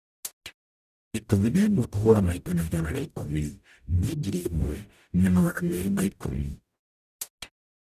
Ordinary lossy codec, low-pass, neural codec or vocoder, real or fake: none; 14.4 kHz; codec, 44.1 kHz, 0.9 kbps, DAC; fake